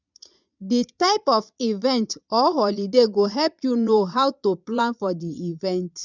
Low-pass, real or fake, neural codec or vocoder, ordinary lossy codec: 7.2 kHz; fake; vocoder, 22.05 kHz, 80 mel bands, Vocos; none